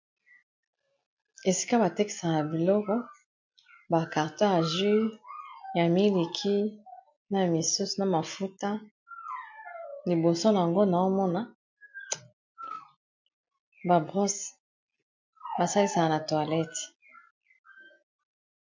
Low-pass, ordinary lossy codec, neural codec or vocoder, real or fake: 7.2 kHz; MP3, 48 kbps; none; real